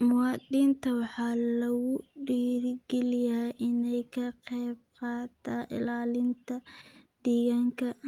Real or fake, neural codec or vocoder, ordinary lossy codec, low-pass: real; none; Opus, 32 kbps; 19.8 kHz